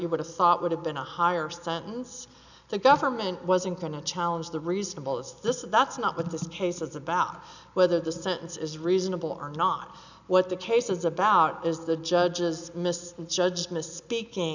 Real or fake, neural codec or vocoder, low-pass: real; none; 7.2 kHz